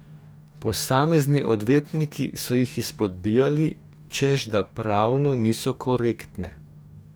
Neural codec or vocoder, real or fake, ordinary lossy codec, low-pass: codec, 44.1 kHz, 2.6 kbps, DAC; fake; none; none